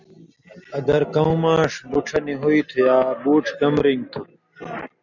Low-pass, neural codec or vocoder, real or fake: 7.2 kHz; none; real